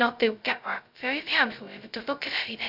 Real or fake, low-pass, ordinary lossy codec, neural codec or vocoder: fake; 5.4 kHz; none; codec, 16 kHz, 0.2 kbps, FocalCodec